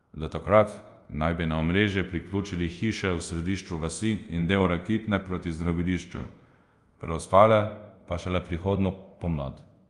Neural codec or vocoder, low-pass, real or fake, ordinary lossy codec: codec, 24 kHz, 0.5 kbps, DualCodec; 10.8 kHz; fake; Opus, 32 kbps